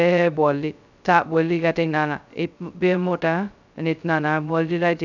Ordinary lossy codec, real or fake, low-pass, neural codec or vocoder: none; fake; 7.2 kHz; codec, 16 kHz, 0.2 kbps, FocalCodec